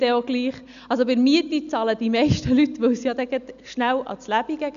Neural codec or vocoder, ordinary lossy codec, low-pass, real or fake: none; none; 7.2 kHz; real